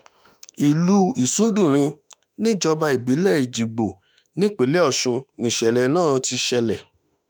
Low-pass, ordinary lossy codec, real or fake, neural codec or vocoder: none; none; fake; autoencoder, 48 kHz, 32 numbers a frame, DAC-VAE, trained on Japanese speech